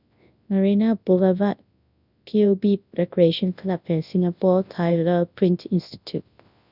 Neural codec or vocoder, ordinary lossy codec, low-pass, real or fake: codec, 24 kHz, 0.9 kbps, WavTokenizer, large speech release; none; 5.4 kHz; fake